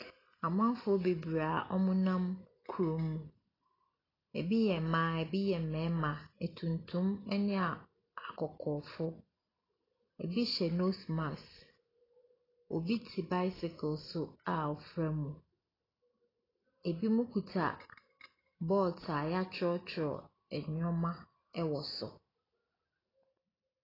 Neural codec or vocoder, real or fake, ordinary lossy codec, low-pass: none; real; AAC, 24 kbps; 5.4 kHz